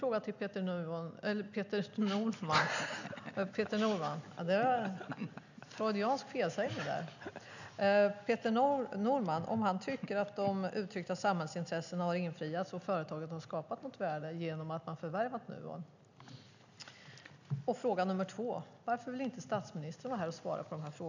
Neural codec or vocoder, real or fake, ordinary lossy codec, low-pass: none; real; none; 7.2 kHz